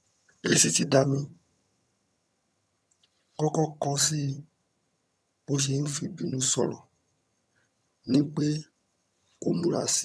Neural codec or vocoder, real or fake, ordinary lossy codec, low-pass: vocoder, 22.05 kHz, 80 mel bands, HiFi-GAN; fake; none; none